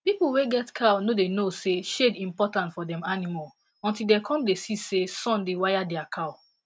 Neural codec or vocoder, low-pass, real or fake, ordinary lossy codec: none; none; real; none